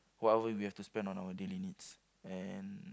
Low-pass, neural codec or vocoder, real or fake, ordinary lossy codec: none; none; real; none